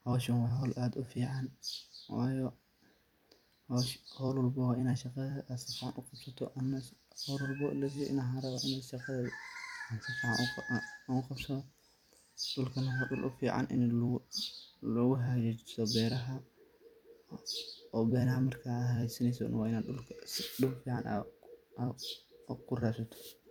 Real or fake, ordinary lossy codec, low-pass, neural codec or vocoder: fake; none; 19.8 kHz; vocoder, 44.1 kHz, 128 mel bands every 256 samples, BigVGAN v2